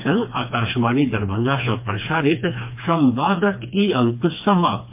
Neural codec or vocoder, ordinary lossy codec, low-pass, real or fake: codec, 16 kHz, 2 kbps, FreqCodec, smaller model; MP3, 32 kbps; 3.6 kHz; fake